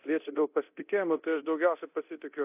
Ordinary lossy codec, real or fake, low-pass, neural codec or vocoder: AAC, 32 kbps; fake; 3.6 kHz; codec, 24 kHz, 0.9 kbps, DualCodec